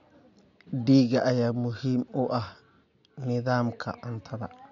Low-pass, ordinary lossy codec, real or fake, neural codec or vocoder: 7.2 kHz; none; real; none